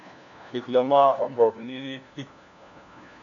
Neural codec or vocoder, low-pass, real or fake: codec, 16 kHz, 1 kbps, FunCodec, trained on LibriTTS, 50 frames a second; 7.2 kHz; fake